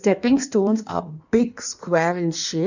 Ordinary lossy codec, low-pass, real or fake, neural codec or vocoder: none; 7.2 kHz; fake; codec, 16 kHz in and 24 kHz out, 1.1 kbps, FireRedTTS-2 codec